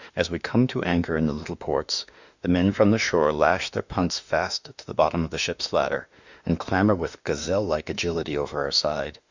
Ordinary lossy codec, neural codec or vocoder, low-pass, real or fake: Opus, 64 kbps; autoencoder, 48 kHz, 32 numbers a frame, DAC-VAE, trained on Japanese speech; 7.2 kHz; fake